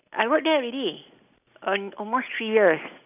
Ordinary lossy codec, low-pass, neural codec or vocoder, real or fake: none; 3.6 kHz; none; real